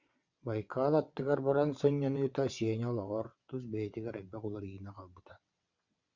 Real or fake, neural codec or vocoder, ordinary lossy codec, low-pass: fake; vocoder, 22.05 kHz, 80 mel bands, WaveNeXt; Opus, 64 kbps; 7.2 kHz